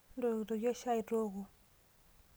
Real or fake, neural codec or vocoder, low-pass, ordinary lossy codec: real; none; none; none